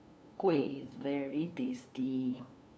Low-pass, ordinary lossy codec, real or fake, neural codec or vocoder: none; none; fake; codec, 16 kHz, 2 kbps, FunCodec, trained on LibriTTS, 25 frames a second